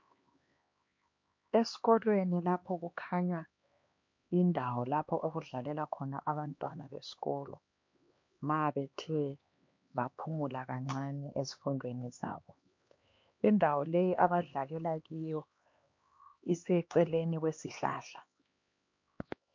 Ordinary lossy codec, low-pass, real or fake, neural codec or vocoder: MP3, 48 kbps; 7.2 kHz; fake; codec, 16 kHz, 2 kbps, X-Codec, HuBERT features, trained on LibriSpeech